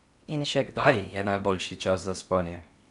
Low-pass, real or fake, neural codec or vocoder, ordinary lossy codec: 10.8 kHz; fake; codec, 16 kHz in and 24 kHz out, 0.8 kbps, FocalCodec, streaming, 65536 codes; none